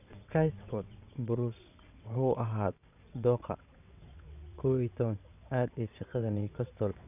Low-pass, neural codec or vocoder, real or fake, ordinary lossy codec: 3.6 kHz; vocoder, 22.05 kHz, 80 mel bands, WaveNeXt; fake; none